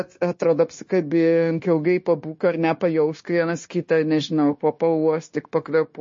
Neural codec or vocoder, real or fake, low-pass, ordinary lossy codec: codec, 16 kHz, 0.9 kbps, LongCat-Audio-Codec; fake; 7.2 kHz; MP3, 32 kbps